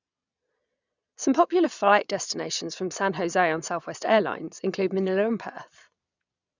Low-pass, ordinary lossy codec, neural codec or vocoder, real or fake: 7.2 kHz; none; vocoder, 22.05 kHz, 80 mel bands, Vocos; fake